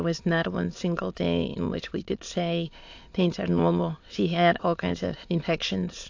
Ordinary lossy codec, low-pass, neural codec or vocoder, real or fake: AAC, 48 kbps; 7.2 kHz; autoencoder, 22.05 kHz, a latent of 192 numbers a frame, VITS, trained on many speakers; fake